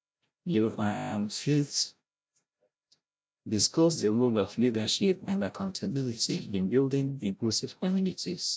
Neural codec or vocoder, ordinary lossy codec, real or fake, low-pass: codec, 16 kHz, 0.5 kbps, FreqCodec, larger model; none; fake; none